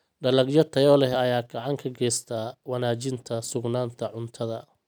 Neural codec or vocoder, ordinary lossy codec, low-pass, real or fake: none; none; none; real